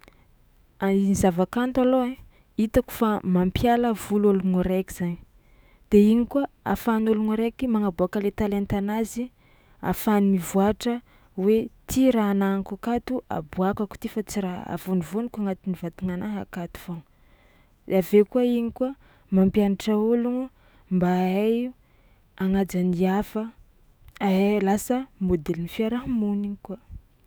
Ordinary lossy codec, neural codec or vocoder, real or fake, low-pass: none; autoencoder, 48 kHz, 128 numbers a frame, DAC-VAE, trained on Japanese speech; fake; none